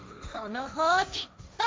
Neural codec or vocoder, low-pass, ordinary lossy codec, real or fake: codec, 16 kHz, 1.1 kbps, Voila-Tokenizer; none; none; fake